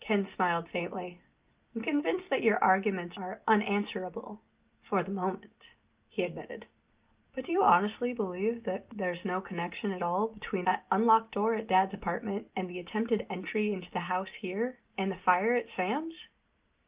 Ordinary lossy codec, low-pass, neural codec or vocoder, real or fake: Opus, 32 kbps; 3.6 kHz; none; real